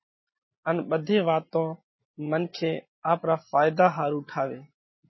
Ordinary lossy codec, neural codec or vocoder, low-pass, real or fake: MP3, 24 kbps; none; 7.2 kHz; real